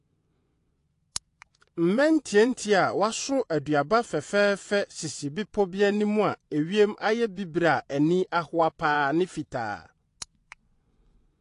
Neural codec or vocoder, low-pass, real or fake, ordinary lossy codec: vocoder, 24 kHz, 100 mel bands, Vocos; 10.8 kHz; fake; AAC, 48 kbps